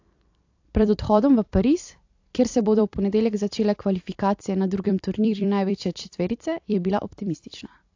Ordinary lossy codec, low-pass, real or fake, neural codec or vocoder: AAC, 48 kbps; 7.2 kHz; fake; vocoder, 44.1 kHz, 128 mel bands every 256 samples, BigVGAN v2